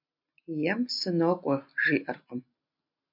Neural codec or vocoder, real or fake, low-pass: none; real; 5.4 kHz